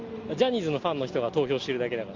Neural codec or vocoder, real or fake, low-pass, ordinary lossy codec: none; real; 7.2 kHz; Opus, 32 kbps